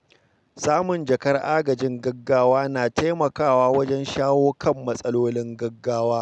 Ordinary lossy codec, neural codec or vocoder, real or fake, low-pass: none; none; real; none